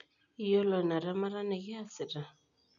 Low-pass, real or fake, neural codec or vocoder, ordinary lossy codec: 7.2 kHz; real; none; none